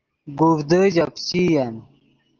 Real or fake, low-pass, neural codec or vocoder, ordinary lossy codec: real; 7.2 kHz; none; Opus, 16 kbps